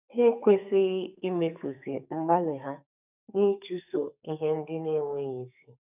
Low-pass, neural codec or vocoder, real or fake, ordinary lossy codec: 3.6 kHz; codec, 44.1 kHz, 2.6 kbps, SNAC; fake; none